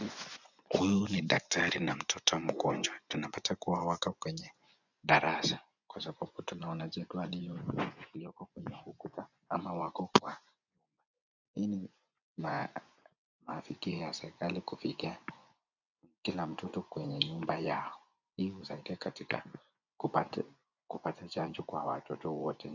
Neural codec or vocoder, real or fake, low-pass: vocoder, 24 kHz, 100 mel bands, Vocos; fake; 7.2 kHz